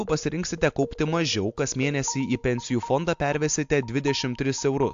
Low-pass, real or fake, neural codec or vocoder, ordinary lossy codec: 7.2 kHz; real; none; AAC, 64 kbps